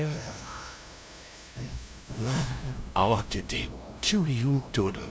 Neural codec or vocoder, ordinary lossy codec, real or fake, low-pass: codec, 16 kHz, 0.5 kbps, FunCodec, trained on LibriTTS, 25 frames a second; none; fake; none